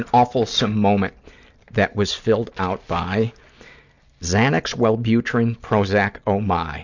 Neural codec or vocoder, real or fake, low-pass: none; real; 7.2 kHz